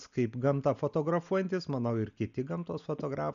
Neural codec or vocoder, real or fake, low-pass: none; real; 7.2 kHz